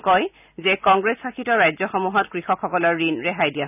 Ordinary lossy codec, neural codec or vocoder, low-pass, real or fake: none; none; 3.6 kHz; real